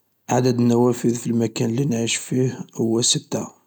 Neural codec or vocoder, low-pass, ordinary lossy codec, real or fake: none; none; none; real